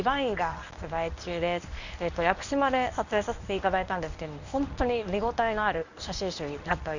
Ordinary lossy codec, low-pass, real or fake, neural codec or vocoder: none; 7.2 kHz; fake; codec, 24 kHz, 0.9 kbps, WavTokenizer, medium speech release version 1